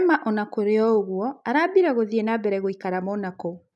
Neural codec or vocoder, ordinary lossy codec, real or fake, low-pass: none; none; real; none